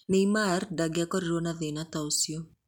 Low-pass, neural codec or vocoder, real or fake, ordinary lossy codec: 19.8 kHz; none; real; MP3, 96 kbps